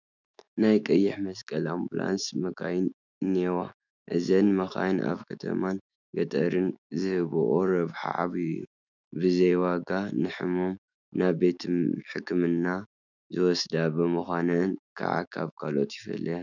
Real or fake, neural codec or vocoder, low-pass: fake; autoencoder, 48 kHz, 128 numbers a frame, DAC-VAE, trained on Japanese speech; 7.2 kHz